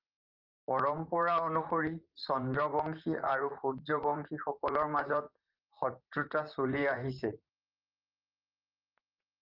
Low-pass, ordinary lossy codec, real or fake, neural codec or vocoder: 5.4 kHz; Opus, 32 kbps; fake; vocoder, 44.1 kHz, 128 mel bands, Pupu-Vocoder